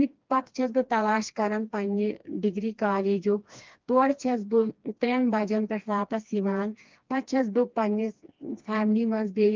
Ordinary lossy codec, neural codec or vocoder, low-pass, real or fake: Opus, 32 kbps; codec, 16 kHz, 2 kbps, FreqCodec, smaller model; 7.2 kHz; fake